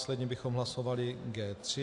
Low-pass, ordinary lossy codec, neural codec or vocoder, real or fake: 10.8 kHz; AAC, 64 kbps; none; real